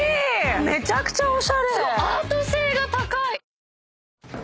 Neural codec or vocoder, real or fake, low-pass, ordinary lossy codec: none; real; none; none